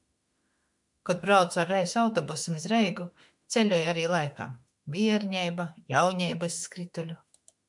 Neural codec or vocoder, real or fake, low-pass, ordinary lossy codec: autoencoder, 48 kHz, 32 numbers a frame, DAC-VAE, trained on Japanese speech; fake; 10.8 kHz; MP3, 96 kbps